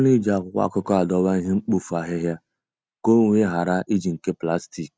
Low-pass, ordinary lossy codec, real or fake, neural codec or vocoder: none; none; real; none